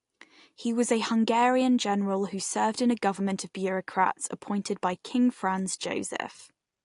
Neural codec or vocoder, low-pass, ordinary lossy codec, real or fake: none; 10.8 kHz; AAC, 48 kbps; real